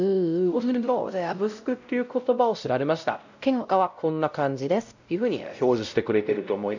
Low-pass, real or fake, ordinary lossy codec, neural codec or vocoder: 7.2 kHz; fake; none; codec, 16 kHz, 0.5 kbps, X-Codec, WavLM features, trained on Multilingual LibriSpeech